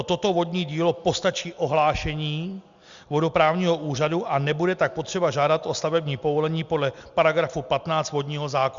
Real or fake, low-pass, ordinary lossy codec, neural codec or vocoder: real; 7.2 kHz; Opus, 64 kbps; none